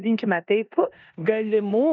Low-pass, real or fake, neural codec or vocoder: 7.2 kHz; fake; codec, 16 kHz in and 24 kHz out, 0.9 kbps, LongCat-Audio-Codec, four codebook decoder